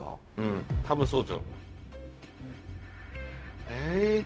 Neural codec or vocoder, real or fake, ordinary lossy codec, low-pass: codec, 16 kHz, 0.4 kbps, LongCat-Audio-Codec; fake; none; none